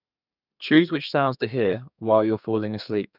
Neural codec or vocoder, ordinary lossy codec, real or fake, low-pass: codec, 32 kHz, 1.9 kbps, SNAC; none; fake; 5.4 kHz